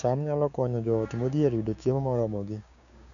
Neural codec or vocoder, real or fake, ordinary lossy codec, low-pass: codec, 16 kHz, 6 kbps, DAC; fake; none; 7.2 kHz